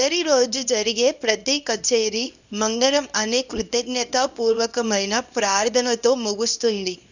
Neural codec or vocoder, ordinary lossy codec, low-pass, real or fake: codec, 24 kHz, 0.9 kbps, WavTokenizer, small release; none; 7.2 kHz; fake